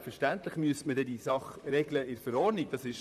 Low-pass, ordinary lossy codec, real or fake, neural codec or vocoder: 14.4 kHz; none; fake; vocoder, 44.1 kHz, 128 mel bands, Pupu-Vocoder